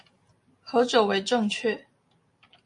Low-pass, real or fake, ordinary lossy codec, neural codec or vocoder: 10.8 kHz; real; MP3, 48 kbps; none